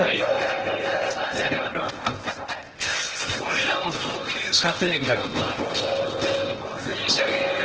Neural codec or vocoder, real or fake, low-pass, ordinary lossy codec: codec, 16 kHz in and 24 kHz out, 0.8 kbps, FocalCodec, streaming, 65536 codes; fake; 7.2 kHz; Opus, 16 kbps